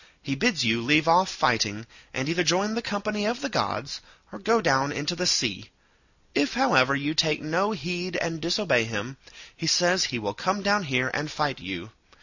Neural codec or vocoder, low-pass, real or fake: none; 7.2 kHz; real